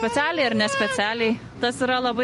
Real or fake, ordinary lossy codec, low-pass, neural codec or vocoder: fake; MP3, 48 kbps; 14.4 kHz; vocoder, 44.1 kHz, 128 mel bands every 256 samples, BigVGAN v2